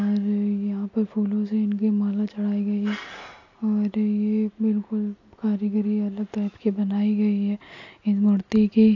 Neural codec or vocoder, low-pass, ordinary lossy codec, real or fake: none; 7.2 kHz; AAC, 48 kbps; real